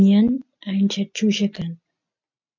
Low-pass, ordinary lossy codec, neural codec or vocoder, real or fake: 7.2 kHz; MP3, 64 kbps; none; real